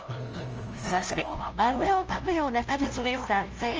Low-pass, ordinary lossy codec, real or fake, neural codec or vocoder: 7.2 kHz; Opus, 24 kbps; fake; codec, 16 kHz, 0.5 kbps, FunCodec, trained on LibriTTS, 25 frames a second